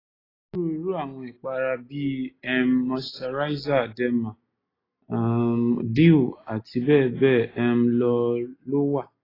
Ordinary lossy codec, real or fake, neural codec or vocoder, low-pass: AAC, 24 kbps; real; none; 5.4 kHz